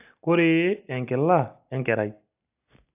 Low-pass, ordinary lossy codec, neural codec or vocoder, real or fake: 3.6 kHz; AAC, 32 kbps; none; real